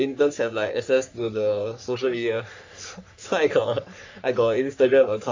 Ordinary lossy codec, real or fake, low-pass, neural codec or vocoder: AAC, 48 kbps; fake; 7.2 kHz; codec, 44.1 kHz, 3.4 kbps, Pupu-Codec